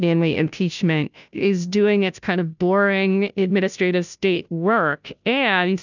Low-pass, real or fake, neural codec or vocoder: 7.2 kHz; fake; codec, 16 kHz, 0.5 kbps, FunCodec, trained on Chinese and English, 25 frames a second